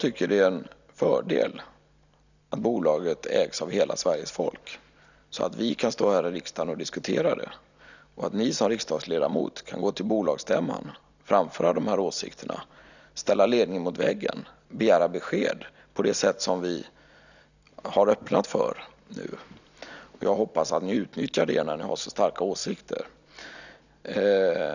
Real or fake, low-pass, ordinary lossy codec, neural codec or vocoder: real; 7.2 kHz; none; none